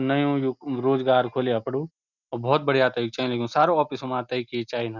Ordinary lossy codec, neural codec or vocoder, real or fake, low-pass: none; none; real; 7.2 kHz